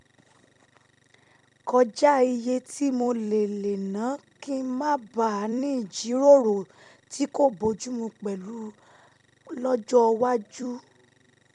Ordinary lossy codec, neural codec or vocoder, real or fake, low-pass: none; vocoder, 44.1 kHz, 128 mel bands every 256 samples, BigVGAN v2; fake; 10.8 kHz